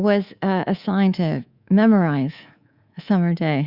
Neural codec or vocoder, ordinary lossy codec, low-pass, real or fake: codec, 24 kHz, 3.1 kbps, DualCodec; Opus, 64 kbps; 5.4 kHz; fake